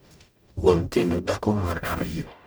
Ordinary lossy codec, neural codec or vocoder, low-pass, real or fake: none; codec, 44.1 kHz, 0.9 kbps, DAC; none; fake